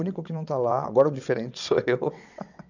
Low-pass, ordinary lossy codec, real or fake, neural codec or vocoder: 7.2 kHz; none; fake; vocoder, 22.05 kHz, 80 mel bands, WaveNeXt